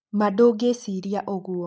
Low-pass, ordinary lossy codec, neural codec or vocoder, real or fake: none; none; none; real